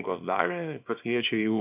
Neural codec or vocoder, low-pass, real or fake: codec, 24 kHz, 0.9 kbps, WavTokenizer, small release; 3.6 kHz; fake